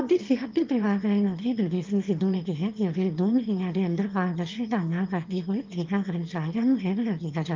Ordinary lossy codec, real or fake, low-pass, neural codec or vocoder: Opus, 32 kbps; fake; 7.2 kHz; autoencoder, 22.05 kHz, a latent of 192 numbers a frame, VITS, trained on one speaker